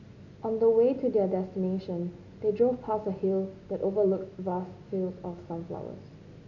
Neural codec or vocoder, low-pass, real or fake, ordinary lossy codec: none; 7.2 kHz; real; none